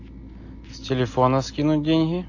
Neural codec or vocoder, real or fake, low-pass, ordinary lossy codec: none; real; 7.2 kHz; AAC, 48 kbps